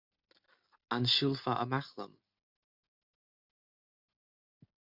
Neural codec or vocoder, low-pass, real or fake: none; 5.4 kHz; real